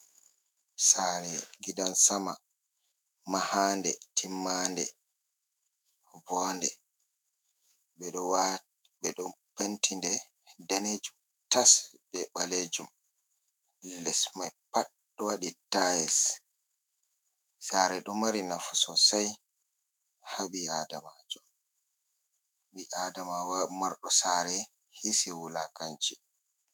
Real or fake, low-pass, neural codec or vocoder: fake; 19.8 kHz; autoencoder, 48 kHz, 128 numbers a frame, DAC-VAE, trained on Japanese speech